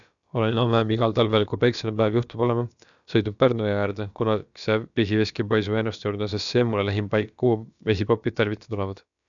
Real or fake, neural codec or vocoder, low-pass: fake; codec, 16 kHz, about 1 kbps, DyCAST, with the encoder's durations; 7.2 kHz